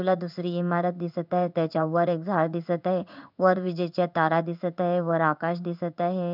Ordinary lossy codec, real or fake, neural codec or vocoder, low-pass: none; fake; codec, 16 kHz in and 24 kHz out, 1 kbps, XY-Tokenizer; 5.4 kHz